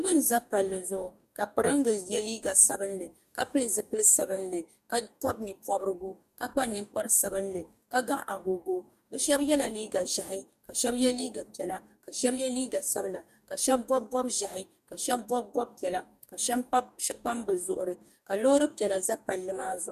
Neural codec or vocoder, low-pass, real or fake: codec, 44.1 kHz, 2.6 kbps, DAC; 14.4 kHz; fake